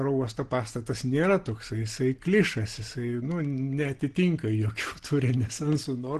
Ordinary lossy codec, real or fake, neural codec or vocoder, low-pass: Opus, 16 kbps; real; none; 10.8 kHz